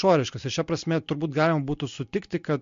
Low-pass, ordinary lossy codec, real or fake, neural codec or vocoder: 7.2 kHz; MP3, 48 kbps; real; none